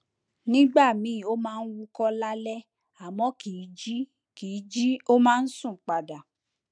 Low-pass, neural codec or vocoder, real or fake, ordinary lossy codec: 9.9 kHz; none; real; none